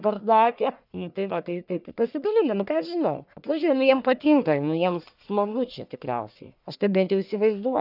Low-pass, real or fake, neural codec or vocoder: 5.4 kHz; fake; codec, 44.1 kHz, 1.7 kbps, Pupu-Codec